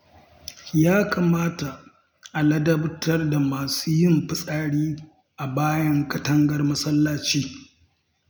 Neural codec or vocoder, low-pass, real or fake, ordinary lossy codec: none; none; real; none